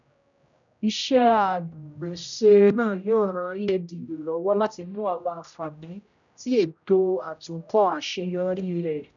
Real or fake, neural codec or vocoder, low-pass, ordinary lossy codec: fake; codec, 16 kHz, 0.5 kbps, X-Codec, HuBERT features, trained on general audio; 7.2 kHz; none